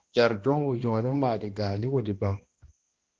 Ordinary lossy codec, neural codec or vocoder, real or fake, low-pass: Opus, 16 kbps; codec, 16 kHz, 1 kbps, X-Codec, HuBERT features, trained on balanced general audio; fake; 7.2 kHz